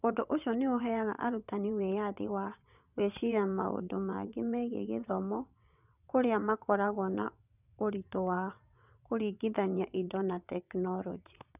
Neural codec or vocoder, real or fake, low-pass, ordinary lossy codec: vocoder, 22.05 kHz, 80 mel bands, WaveNeXt; fake; 3.6 kHz; none